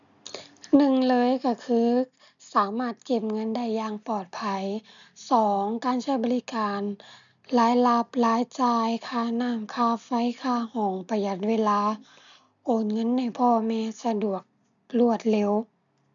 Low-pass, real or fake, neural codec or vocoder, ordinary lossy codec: 7.2 kHz; real; none; none